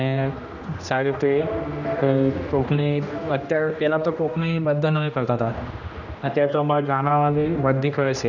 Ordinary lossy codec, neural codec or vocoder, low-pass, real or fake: none; codec, 16 kHz, 1 kbps, X-Codec, HuBERT features, trained on general audio; 7.2 kHz; fake